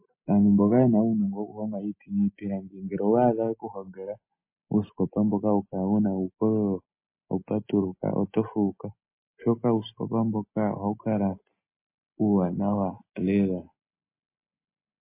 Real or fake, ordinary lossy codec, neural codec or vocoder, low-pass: real; MP3, 24 kbps; none; 3.6 kHz